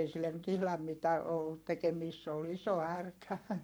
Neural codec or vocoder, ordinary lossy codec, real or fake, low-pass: codec, 44.1 kHz, 7.8 kbps, Pupu-Codec; none; fake; none